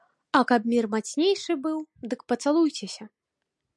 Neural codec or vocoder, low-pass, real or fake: none; 10.8 kHz; real